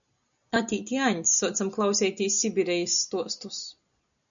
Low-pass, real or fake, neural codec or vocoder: 7.2 kHz; real; none